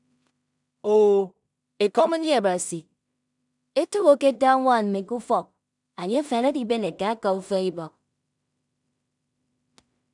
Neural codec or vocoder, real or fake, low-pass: codec, 16 kHz in and 24 kHz out, 0.4 kbps, LongCat-Audio-Codec, two codebook decoder; fake; 10.8 kHz